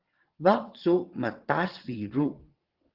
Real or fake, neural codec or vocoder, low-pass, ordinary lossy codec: fake; vocoder, 22.05 kHz, 80 mel bands, WaveNeXt; 5.4 kHz; Opus, 16 kbps